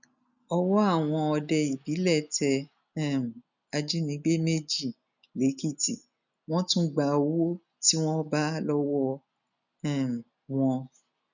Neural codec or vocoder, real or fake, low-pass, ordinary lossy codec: none; real; 7.2 kHz; none